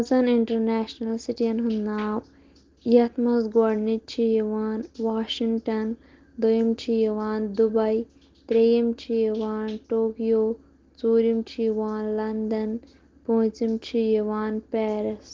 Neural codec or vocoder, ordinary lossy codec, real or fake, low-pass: none; Opus, 16 kbps; real; 7.2 kHz